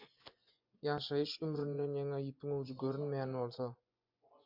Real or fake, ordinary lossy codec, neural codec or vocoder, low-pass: fake; MP3, 48 kbps; vocoder, 24 kHz, 100 mel bands, Vocos; 5.4 kHz